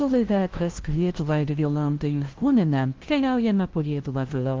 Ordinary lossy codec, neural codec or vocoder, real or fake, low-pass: Opus, 16 kbps; codec, 16 kHz, 0.5 kbps, FunCodec, trained on LibriTTS, 25 frames a second; fake; 7.2 kHz